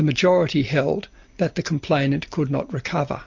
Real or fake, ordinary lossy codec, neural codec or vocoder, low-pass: real; MP3, 48 kbps; none; 7.2 kHz